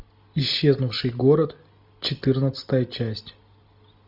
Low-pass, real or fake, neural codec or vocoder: 5.4 kHz; real; none